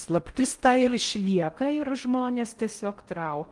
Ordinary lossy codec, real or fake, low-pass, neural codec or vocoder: Opus, 24 kbps; fake; 10.8 kHz; codec, 16 kHz in and 24 kHz out, 0.6 kbps, FocalCodec, streaming, 4096 codes